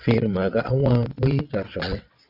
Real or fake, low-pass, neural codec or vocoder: fake; 5.4 kHz; vocoder, 22.05 kHz, 80 mel bands, WaveNeXt